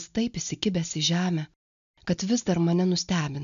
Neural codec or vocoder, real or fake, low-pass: none; real; 7.2 kHz